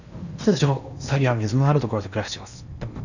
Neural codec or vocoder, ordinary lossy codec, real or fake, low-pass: codec, 16 kHz in and 24 kHz out, 0.8 kbps, FocalCodec, streaming, 65536 codes; none; fake; 7.2 kHz